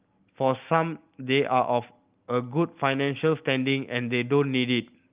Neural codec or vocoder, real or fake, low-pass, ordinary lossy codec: none; real; 3.6 kHz; Opus, 32 kbps